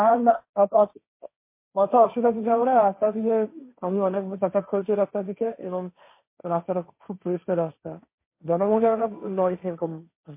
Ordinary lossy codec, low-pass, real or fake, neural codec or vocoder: MP3, 24 kbps; 3.6 kHz; fake; codec, 16 kHz, 1.1 kbps, Voila-Tokenizer